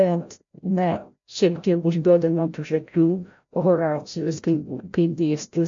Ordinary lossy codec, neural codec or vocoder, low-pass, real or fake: MP3, 48 kbps; codec, 16 kHz, 0.5 kbps, FreqCodec, larger model; 7.2 kHz; fake